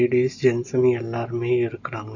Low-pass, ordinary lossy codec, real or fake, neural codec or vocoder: 7.2 kHz; none; real; none